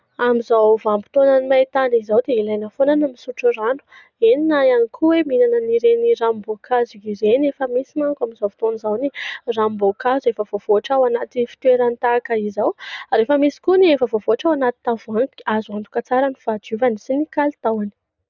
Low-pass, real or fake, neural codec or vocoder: 7.2 kHz; real; none